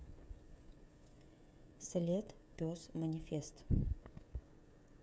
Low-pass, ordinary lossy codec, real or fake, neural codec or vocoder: none; none; fake; codec, 16 kHz, 16 kbps, FreqCodec, smaller model